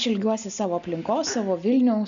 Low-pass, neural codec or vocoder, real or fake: 7.2 kHz; none; real